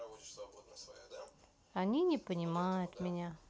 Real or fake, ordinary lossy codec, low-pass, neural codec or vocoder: real; none; none; none